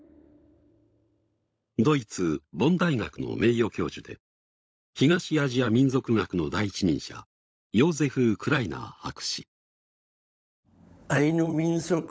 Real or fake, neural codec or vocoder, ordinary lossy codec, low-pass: fake; codec, 16 kHz, 16 kbps, FunCodec, trained on LibriTTS, 50 frames a second; none; none